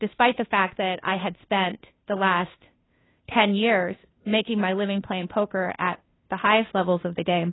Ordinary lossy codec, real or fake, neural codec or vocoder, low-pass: AAC, 16 kbps; fake; codec, 16 kHz, 2 kbps, FunCodec, trained on Chinese and English, 25 frames a second; 7.2 kHz